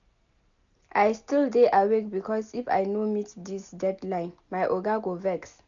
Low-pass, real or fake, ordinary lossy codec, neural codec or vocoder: 7.2 kHz; real; none; none